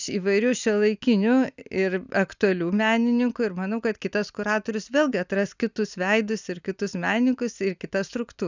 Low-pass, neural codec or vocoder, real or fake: 7.2 kHz; none; real